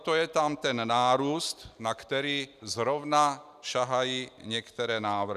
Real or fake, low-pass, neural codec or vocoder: real; 14.4 kHz; none